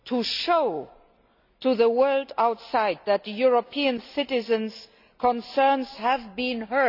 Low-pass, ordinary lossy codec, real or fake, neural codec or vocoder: 5.4 kHz; none; real; none